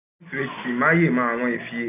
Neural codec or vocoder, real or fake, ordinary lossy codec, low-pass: none; real; none; 3.6 kHz